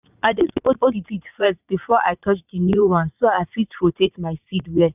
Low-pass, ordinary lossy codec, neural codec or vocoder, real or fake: 3.6 kHz; none; vocoder, 44.1 kHz, 80 mel bands, Vocos; fake